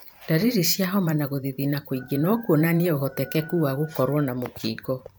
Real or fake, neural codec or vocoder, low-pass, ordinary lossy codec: fake; vocoder, 44.1 kHz, 128 mel bands every 512 samples, BigVGAN v2; none; none